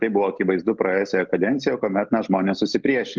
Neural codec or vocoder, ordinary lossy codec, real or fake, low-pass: none; Opus, 32 kbps; real; 7.2 kHz